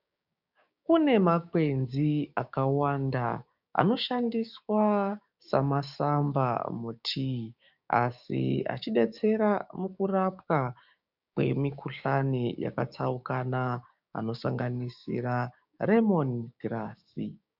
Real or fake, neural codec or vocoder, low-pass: fake; codec, 16 kHz, 6 kbps, DAC; 5.4 kHz